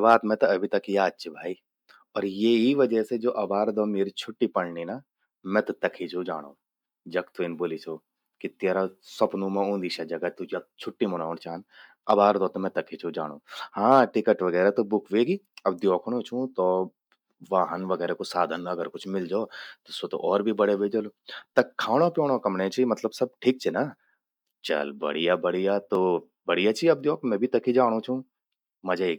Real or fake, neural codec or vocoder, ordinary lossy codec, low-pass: real; none; none; 19.8 kHz